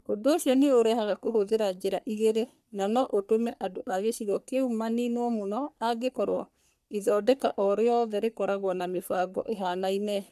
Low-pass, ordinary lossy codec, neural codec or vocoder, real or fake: 14.4 kHz; none; codec, 44.1 kHz, 3.4 kbps, Pupu-Codec; fake